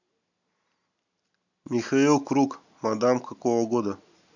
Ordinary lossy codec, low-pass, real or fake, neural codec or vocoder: none; 7.2 kHz; real; none